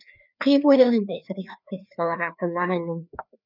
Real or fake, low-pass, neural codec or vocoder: fake; 5.4 kHz; codec, 16 kHz, 2 kbps, FreqCodec, larger model